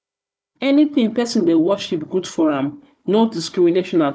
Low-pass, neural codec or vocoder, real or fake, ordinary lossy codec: none; codec, 16 kHz, 4 kbps, FunCodec, trained on Chinese and English, 50 frames a second; fake; none